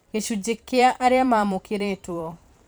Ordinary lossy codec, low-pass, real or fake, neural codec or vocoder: none; none; fake; vocoder, 44.1 kHz, 128 mel bands every 512 samples, BigVGAN v2